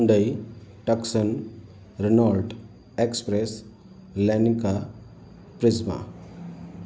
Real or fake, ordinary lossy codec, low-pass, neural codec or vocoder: real; none; none; none